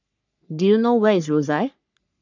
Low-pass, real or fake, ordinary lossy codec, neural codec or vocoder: 7.2 kHz; fake; none; codec, 44.1 kHz, 3.4 kbps, Pupu-Codec